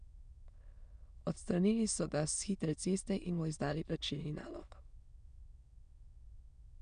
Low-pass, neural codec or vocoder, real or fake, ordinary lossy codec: 9.9 kHz; autoencoder, 22.05 kHz, a latent of 192 numbers a frame, VITS, trained on many speakers; fake; none